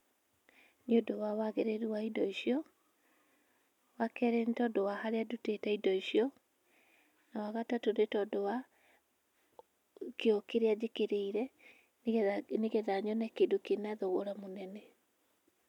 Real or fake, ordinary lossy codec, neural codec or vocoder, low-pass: real; none; none; 19.8 kHz